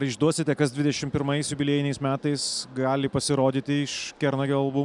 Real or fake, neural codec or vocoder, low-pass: real; none; 10.8 kHz